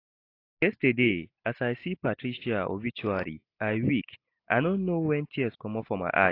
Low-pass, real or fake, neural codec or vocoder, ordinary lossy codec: 5.4 kHz; real; none; AAC, 32 kbps